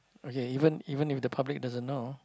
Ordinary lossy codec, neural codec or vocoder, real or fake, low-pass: none; none; real; none